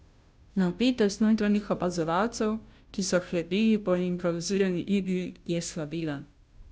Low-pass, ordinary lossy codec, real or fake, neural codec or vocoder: none; none; fake; codec, 16 kHz, 0.5 kbps, FunCodec, trained on Chinese and English, 25 frames a second